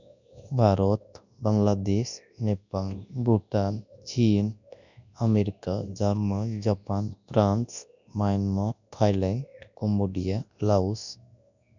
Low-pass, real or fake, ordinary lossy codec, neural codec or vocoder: 7.2 kHz; fake; none; codec, 24 kHz, 0.9 kbps, WavTokenizer, large speech release